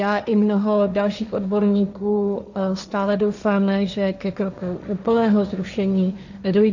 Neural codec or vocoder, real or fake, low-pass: codec, 16 kHz, 1.1 kbps, Voila-Tokenizer; fake; 7.2 kHz